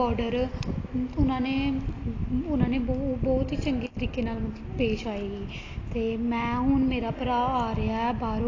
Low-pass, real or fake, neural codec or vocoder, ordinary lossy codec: 7.2 kHz; real; none; AAC, 32 kbps